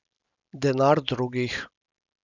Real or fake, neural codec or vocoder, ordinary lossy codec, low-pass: real; none; none; 7.2 kHz